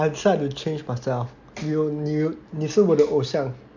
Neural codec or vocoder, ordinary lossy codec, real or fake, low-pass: none; none; real; 7.2 kHz